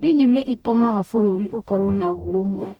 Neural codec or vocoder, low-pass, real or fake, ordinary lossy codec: codec, 44.1 kHz, 0.9 kbps, DAC; 19.8 kHz; fake; Opus, 64 kbps